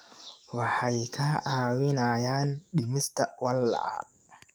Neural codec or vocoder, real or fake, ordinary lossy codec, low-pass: codec, 44.1 kHz, 7.8 kbps, DAC; fake; none; none